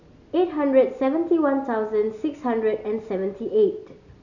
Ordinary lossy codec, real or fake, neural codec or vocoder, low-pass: none; real; none; 7.2 kHz